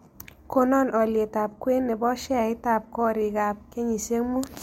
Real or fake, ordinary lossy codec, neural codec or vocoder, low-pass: real; MP3, 64 kbps; none; 19.8 kHz